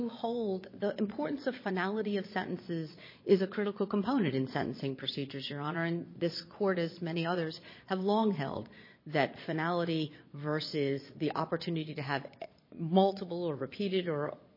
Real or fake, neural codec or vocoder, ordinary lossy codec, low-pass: fake; vocoder, 22.05 kHz, 80 mel bands, Vocos; MP3, 24 kbps; 5.4 kHz